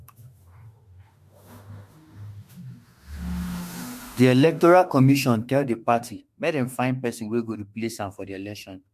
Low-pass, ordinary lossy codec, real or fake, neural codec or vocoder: 14.4 kHz; MP3, 64 kbps; fake; autoencoder, 48 kHz, 32 numbers a frame, DAC-VAE, trained on Japanese speech